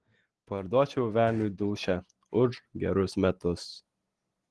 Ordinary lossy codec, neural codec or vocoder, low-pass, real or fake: Opus, 16 kbps; none; 10.8 kHz; real